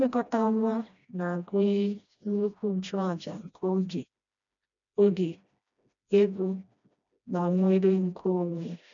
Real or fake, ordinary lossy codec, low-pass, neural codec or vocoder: fake; none; 7.2 kHz; codec, 16 kHz, 1 kbps, FreqCodec, smaller model